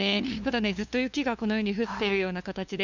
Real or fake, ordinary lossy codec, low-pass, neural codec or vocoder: fake; none; 7.2 kHz; codec, 16 kHz, 2 kbps, FunCodec, trained on LibriTTS, 25 frames a second